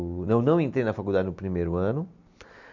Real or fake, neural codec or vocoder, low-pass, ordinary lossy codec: real; none; 7.2 kHz; none